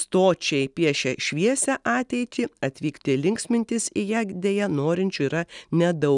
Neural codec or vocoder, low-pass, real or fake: none; 10.8 kHz; real